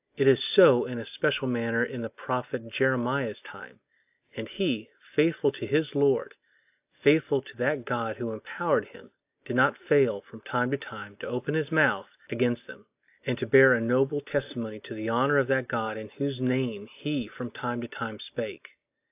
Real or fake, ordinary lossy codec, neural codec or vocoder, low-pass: real; AAC, 32 kbps; none; 3.6 kHz